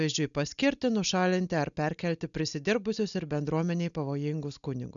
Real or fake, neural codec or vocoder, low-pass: real; none; 7.2 kHz